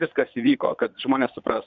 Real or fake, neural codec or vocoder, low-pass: fake; vocoder, 22.05 kHz, 80 mel bands, Vocos; 7.2 kHz